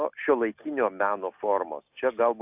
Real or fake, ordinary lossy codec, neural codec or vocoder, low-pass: real; AAC, 32 kbps; none; 3.6 kHz